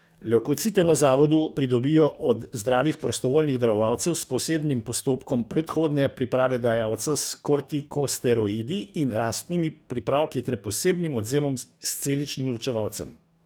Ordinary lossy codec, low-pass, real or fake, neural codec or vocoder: none; none; fake; codec, 44.1 kHz, 2.6 kbps, DAC